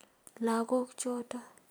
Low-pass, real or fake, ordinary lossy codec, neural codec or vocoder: none; fake; none; vocoder, 44.1 kHz, 128 mel bands every 256 samples, BigVGAN v2